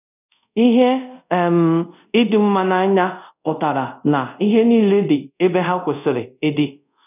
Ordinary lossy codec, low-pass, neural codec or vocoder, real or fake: none; 3.6 kHz; codec, 24 kHz, 0.5 kbps, DualCodec; fake